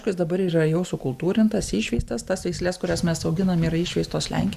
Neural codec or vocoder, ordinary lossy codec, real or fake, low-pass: none; Opus, 64 kbps; real; 14.4 kHz